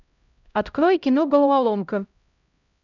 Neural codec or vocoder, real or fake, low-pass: codec, 16 kHz, 0.5 kbps, X-Codec, HuBERT features, trained on LibriSpeech; fake; 7.2 kHz